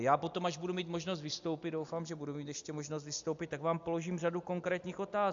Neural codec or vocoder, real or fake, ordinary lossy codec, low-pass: none; real; AAC, 64 kbps; 7.2 kHz